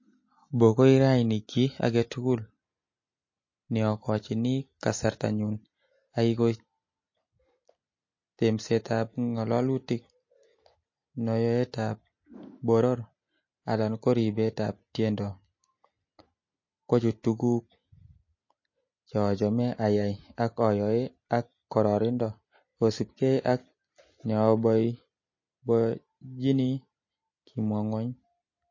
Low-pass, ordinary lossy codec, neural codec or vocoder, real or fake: 7.2 kHz; MP3, 32 kbps; none; real